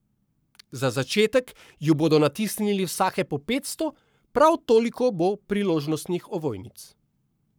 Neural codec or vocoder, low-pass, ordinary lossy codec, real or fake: codec, 44.1 kHz, 7.8 kbps, Pupu-Codec; none; none; fake